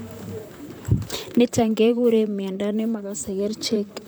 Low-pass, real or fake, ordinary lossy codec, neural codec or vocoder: none; fake; none; vocoder, 44.1 kHz, 128 mel bands, Pupu-Vocoder